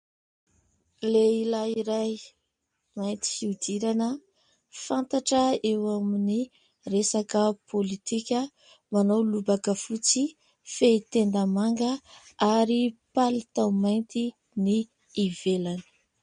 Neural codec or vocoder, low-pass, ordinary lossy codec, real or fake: none; 9.9 kHz; MP3, 48 kbps; real